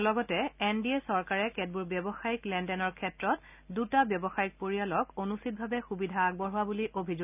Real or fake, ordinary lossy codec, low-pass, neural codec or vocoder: real; none; 3.6 kHz; none